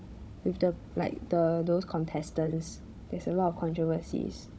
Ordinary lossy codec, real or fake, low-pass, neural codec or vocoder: none; fake; none; codec, 16 kHz, 16 kbps, FunCodec, trained on Chinese and English, 50 frames a second